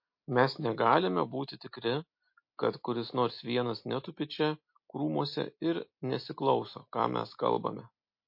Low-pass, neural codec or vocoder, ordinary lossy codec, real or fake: 5.4 kHz; none; MP3, 32 kbps; real